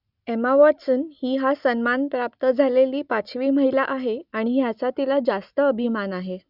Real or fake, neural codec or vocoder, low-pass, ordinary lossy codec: real; none; 5.4 kHz; Opus, 64 kbps